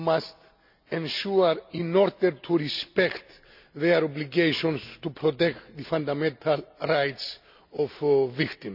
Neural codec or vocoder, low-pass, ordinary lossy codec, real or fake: none; 5.4 kHz; none; real